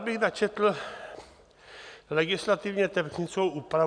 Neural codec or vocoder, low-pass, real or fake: none; 9.9 kHz; real